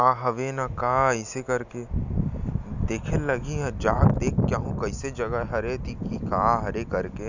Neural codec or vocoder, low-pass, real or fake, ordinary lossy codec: none; 7.2 kHz; real; none